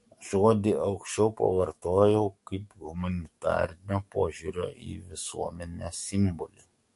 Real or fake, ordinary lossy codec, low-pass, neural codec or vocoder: fake; MP3, 48 kbps; 14.4 kHz; codec, 44.1 kHz, 7.8 kbps, DAC